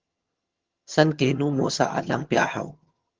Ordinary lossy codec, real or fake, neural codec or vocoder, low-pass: Opus, 16 kbps; fake; vocoder, 22.05 kHz, 80 mel bands, HiFi-GAN; 7.2 kHz